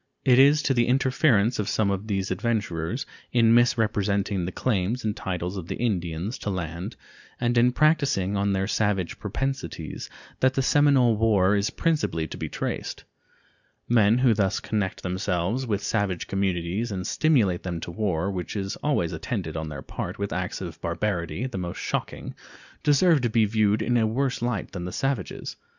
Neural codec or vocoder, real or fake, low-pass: none; real; 7.2 kHz